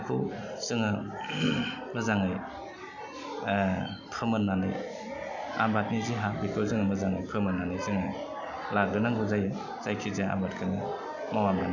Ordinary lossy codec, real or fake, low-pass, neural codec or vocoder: none; real; 7.2 kHz; none